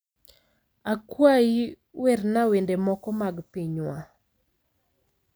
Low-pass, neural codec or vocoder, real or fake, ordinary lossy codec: none; none; real; none